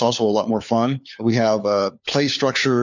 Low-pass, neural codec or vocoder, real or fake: 7.2 kHz; codec, 16 kHz, 4 kbps, FunCodec, trained on LibriTTS, 50 frames a second; fake